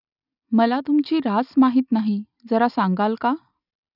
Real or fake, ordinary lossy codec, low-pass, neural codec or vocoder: real; none; 5.4 kHz; none